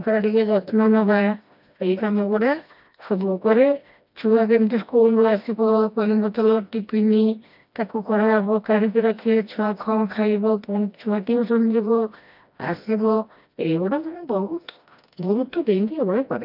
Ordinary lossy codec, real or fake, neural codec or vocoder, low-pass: none; fake; codec, 16 kHz, 1 kbps, FreqCodec, smaller model; 5.4 kHz